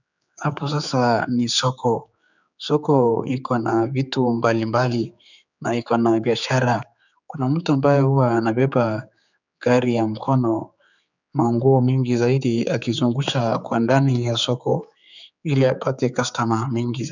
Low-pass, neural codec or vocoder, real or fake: 7.2 kHz; codec, 16 kHz, 4 kbps, X-Codec, HuBERT features, trained on general audio; fake